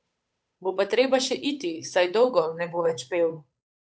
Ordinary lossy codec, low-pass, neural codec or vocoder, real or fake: none; none; codec, 16 kHz, 8 kbps, FunCodec, trained on Chinese and English, 25 frames a second; fake